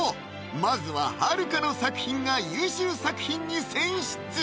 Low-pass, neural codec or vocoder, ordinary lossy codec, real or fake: none; none; none; real